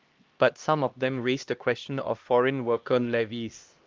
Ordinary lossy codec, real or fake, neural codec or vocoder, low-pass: Opus, 24 kbps; fake; codec, 16 kHz, 1 kbps, X-Codec, HuBERT features, trained on LibriSpeech; 7.2 kHz